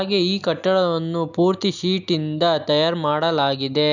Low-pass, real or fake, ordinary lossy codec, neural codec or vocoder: 7.2 kHz; real; none; none